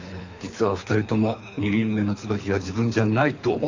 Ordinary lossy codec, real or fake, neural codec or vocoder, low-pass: AAC, 48 kbps; fake; codec, 24 kHz, 3 kbps, HILCodec; 7.2 kHz